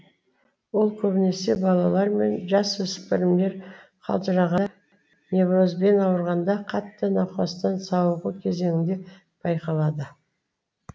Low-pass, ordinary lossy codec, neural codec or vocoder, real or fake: none; none; none; real